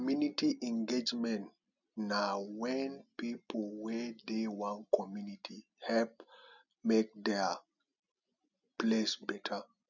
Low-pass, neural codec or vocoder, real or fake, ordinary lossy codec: 7.2 kHz; none; real; none